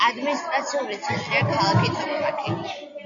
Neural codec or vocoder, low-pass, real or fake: none; 7.2 kHz; real